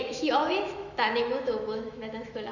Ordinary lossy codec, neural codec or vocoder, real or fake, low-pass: none; autoencoder, 48 kHz, 128 numbers a frame, DAC-VAE, trained on Japanese speech; fake; 7.2 kHz